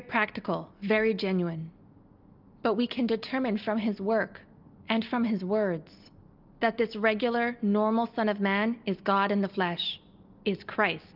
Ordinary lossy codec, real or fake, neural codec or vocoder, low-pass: Opus, 24 kbps; real; none; 5.4 kHz